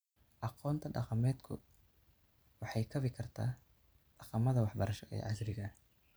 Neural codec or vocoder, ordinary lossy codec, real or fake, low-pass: none; none; real; none